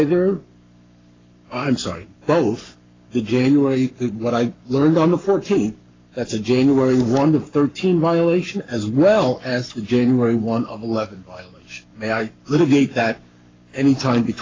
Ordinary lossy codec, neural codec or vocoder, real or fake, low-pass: AAC, 32 kbps; codec, 44.1 kHz, 7.8 kbps, Pupu-Codec; fake; 7.2 kHz